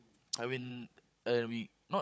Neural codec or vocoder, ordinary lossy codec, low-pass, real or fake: codec, 16 kHz, 16 kbps, FunCodec, trained on Chinese and English, 50 frames a second; none; none; fake